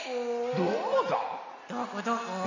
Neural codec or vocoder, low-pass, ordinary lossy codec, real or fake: none; 7.2 kHz; none; real